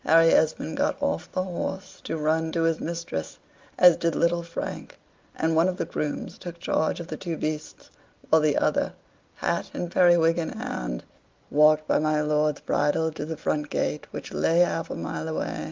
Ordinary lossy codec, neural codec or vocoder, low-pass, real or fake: Opus, 32 kbps; none; 7.2 kHz; real